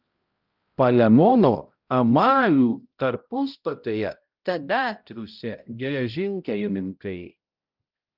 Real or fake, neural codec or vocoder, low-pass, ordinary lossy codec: fake; codec, 16 kHz, 0.5 kbps, X-Codec, HuBERT features, trained on balanced general audio; 5.4 kHz; Opus, 24 kbps